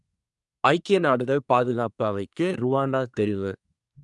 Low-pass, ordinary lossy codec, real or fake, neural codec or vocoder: 10.8 kHz; none; fake; codec, 24 kHz, 1 kbps, SNAC